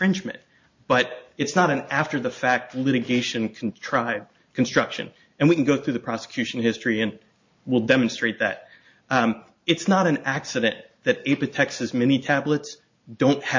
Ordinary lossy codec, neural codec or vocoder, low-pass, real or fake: MP3, 48 kbps; none; 7.2 kHz; real